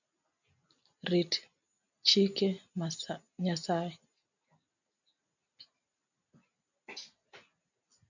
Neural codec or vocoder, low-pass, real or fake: none; 7.2 kHz; real